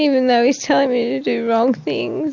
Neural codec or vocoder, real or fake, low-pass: none; real; 7.2 kHz